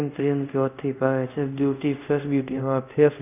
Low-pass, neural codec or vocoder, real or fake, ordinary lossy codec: 3.6 kHz; codec, 24 kHz, 0.5 kbps, DualCodec; fake; none